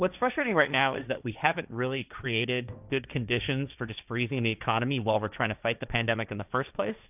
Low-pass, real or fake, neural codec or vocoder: 3.6 kHz; fake; codec, 16 kHz, 1.1 kbps, Voila-Tokenizer